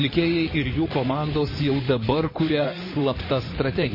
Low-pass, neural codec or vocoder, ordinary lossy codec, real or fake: 5.4 kHz; codec, 16 kHz in and 24 kHz out, 2.2 kbps, FireRedTTS-2 codec; MP3, 24 kbps; fake